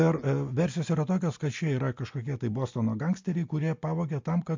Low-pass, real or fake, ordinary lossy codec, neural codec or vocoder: 7.2 kHz; real; MP3, 48 kbps; none